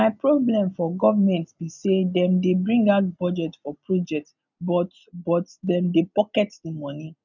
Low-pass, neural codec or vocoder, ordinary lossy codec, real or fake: 7.2 kHz; none; none; real